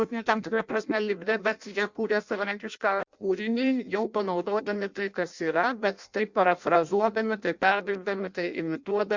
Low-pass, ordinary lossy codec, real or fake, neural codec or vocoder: 7.2 kHz; Opus, 64 kbps; fake; codec, 16 kHz in and 24 kHz out, 0.6 kbps, FireRedTTS-2 codec